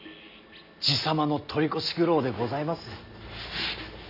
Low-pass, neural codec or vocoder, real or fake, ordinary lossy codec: 5.4 kHz; none; real; none